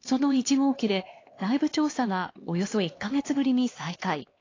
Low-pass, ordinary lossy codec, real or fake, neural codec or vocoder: 7.2 kHz; AAC, 32 kbps; fake; codec, 16 kHz, 2 kbps, X-Codec, HuBERT features, trained on LibriSpeech